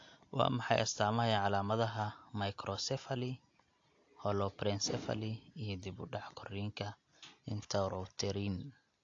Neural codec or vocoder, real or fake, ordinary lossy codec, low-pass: none; real; AAC, 48 kbps; 7.2 kHz